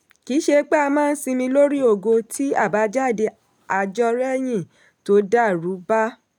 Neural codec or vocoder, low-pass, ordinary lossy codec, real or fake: none; 19.8 kHz; none; real